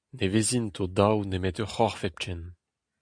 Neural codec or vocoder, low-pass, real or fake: none; 9.9 kHz; real